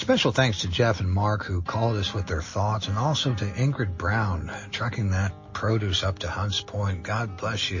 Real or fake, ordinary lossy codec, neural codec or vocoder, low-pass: real; MP3, 32 kbps; none; 7.2 kHz